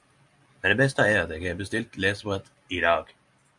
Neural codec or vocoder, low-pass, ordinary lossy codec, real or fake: none; 10.8 kHz; MP3, 96 kbps; real